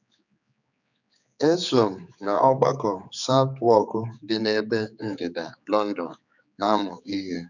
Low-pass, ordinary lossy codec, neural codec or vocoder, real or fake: 7.2 kHz; none; codec, 16 kHz, 4 kbps, X-Codec, HuBERT features, trained on general audio; fake